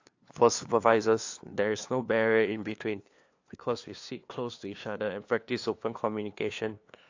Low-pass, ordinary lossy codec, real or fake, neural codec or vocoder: 7.2 kHz; AAC, 48 kbps; fake; codec, 16 kHz, 2 kbps, FunCodec, trained on LibriTTS, 25 frames a second